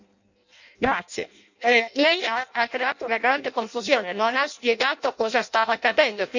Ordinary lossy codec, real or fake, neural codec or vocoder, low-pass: none; fake; codec, 16 kHz in and 24 kHz out, 0.6 kbps, FireRedTTS-2 codec; 7.2 kHz